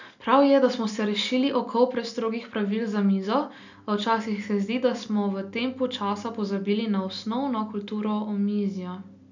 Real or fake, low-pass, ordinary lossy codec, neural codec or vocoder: real; 7.2 kHz; none; none